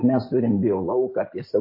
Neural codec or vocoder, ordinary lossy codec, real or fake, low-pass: none; MP3, 24 kbps; real; 5.4 kHz